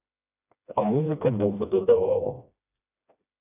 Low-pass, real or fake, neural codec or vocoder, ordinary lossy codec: 3.6 kHz; fake; codec, 16 kHz, 1 kbps, FreqCodec, smaller model; AAC, 32 kbps